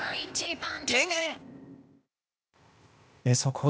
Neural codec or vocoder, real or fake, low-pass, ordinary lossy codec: codec, 16 kHz, 0.8 kbps, ZipCodec; fake; none; none